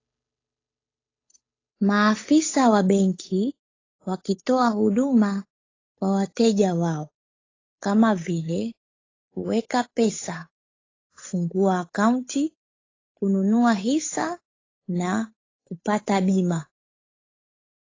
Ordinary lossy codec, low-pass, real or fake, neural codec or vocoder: AAC, 32 kbps; 7.2 kHz; fake; codec, 16 kHz, 8 kbps, FunCodec, trained on Chinese and English, 25 frames a second